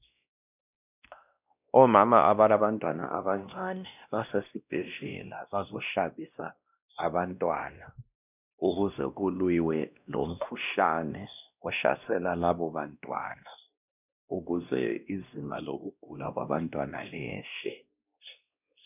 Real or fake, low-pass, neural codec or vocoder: fake; 3.6 kHz; codec, 16 kHz, 1 kbps, X-Codec, WavLM features, trained on Multilingual LibriSpeech